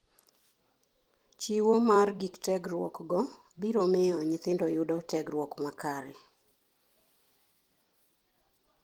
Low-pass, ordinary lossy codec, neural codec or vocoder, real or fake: 19.8 kHz; Opus, 24 kbps; vocoder, 44.1 kHz, 128 mel bands every 256 samples, BigVGAN v2; fake